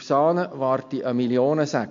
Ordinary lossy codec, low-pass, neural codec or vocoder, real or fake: MP3, 48 kbps; 7.2 kHz; none; real